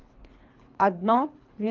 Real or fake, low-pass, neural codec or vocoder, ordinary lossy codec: fake; 7.2 kHz; codec, 24 kHz, 3 kbps, HILCodec; Opus, 24 kbps